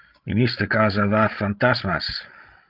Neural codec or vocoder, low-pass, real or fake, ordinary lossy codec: codec, 16 kHz, 16 kbps, FreqCodec, larger model; 5.4 kHz; fake; Opus, 32 kbps